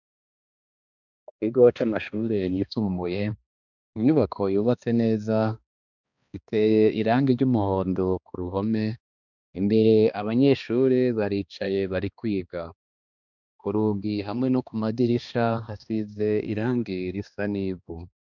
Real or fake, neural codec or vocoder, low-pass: fake; codec, 16 kHz, 2 kbps, X-Codec, HuBERT features, trained on balanced general audio; 7.2 kHz